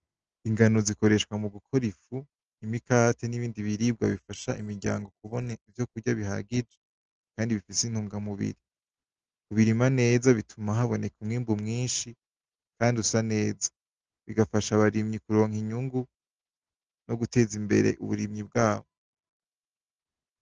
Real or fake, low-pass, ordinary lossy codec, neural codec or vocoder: real; 7.2 kHz; Opus, 16 kbps; none